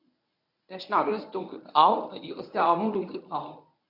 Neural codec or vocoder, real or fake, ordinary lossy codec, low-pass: codec, 24 kHz, 0.9 kbps, WavTokenizer, medium speech release version 1; fake; none; 5.4 kHz